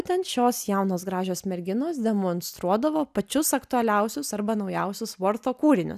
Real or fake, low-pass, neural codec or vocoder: real; 14.4 kHz; none